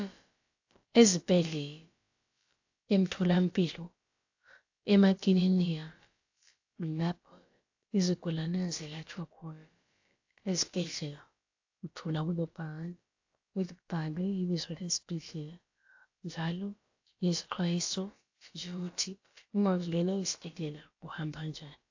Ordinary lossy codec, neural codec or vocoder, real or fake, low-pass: AAC, 48 kbps; codec, 16 kHz, about 1 kbps, DyCAST, with the encoder's durations; fake; 7.2 kHz